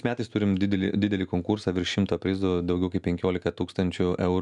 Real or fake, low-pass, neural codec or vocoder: real; 10.8 kHz; none